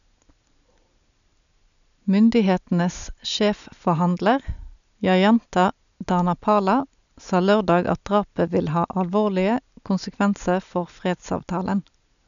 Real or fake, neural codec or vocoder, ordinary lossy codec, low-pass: real; none; none; 7.2 kHz